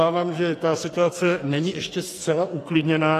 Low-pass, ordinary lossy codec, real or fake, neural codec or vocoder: 14.4 kHz; AAC, 48 kbps; fake; codec, 44.1 kHz, 2.6 kbps, SNAC